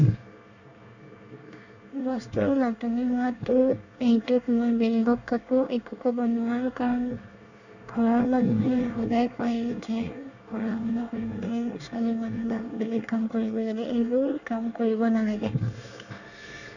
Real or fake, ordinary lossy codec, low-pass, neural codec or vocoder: fake; none; 7.2 kHz; codec, 24 kHz, 1 kbps, SNAC